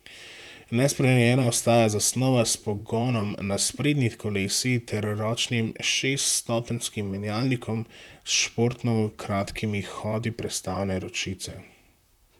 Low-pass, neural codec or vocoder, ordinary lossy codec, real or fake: 19.8 kHz; vocoder, 44.1 kHz, 128 mel bands, Pupu-Vocoder; none; fake